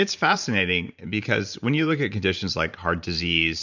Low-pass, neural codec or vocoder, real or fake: 7.2 kHz; vocoder, 44.1 kHz, 128 mel bands every 512 samples, BigVGAN v2; fake